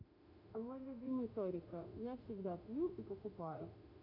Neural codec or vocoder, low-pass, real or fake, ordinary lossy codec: autoencoder, 48 kHz, 32 numbers a frame, DAC-VAE, trained on Japanese speech; 5.4 kHz; fake; none